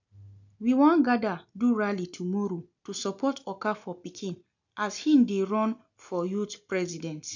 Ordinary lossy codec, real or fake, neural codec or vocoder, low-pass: none; real; none; 7.2 kHz